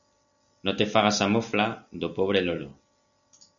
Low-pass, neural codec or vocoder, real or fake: 7.2 kHz; none; real